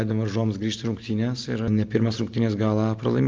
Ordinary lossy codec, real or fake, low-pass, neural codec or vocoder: Opus, 32 kbps; real; 7.2 kHz; none